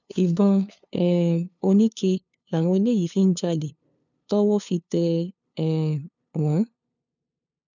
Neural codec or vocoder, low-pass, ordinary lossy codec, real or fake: codec, 16 kHz, 2 kbps, FunCodec, trained on LibriTTS, 25 frames a second; 7.2 kHz; none; fake